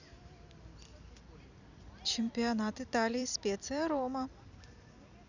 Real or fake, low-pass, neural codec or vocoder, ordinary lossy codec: fake; 7.2 kHz; vocoder, 44.1 kHz, 128 mel bands every 256 samples, BigVGAN v2; none